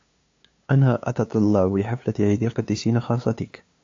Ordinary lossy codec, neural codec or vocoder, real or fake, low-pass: AAC, 48 kbps; codec, 16 kHz, 2 kbps, FunCodec, trained on LibriTTS, 25 frames a second; fake; 7.2 kHz